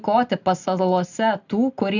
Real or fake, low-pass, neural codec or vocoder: real; 7.2 kHz; none